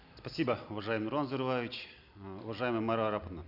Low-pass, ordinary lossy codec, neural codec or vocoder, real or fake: 5.4 kHz; none; none; real